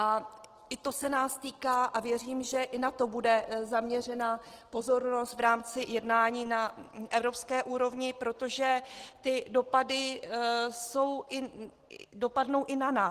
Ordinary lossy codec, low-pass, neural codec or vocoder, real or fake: Opus, 16 kbps; 14.4 kHz; none; real